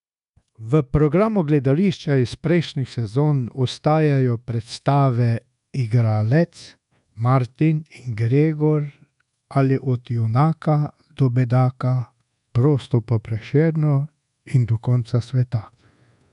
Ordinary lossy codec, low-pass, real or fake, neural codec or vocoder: none; 10.8 kHz; fake; codec, 24 kHz, 1.2 kbps, DualCodec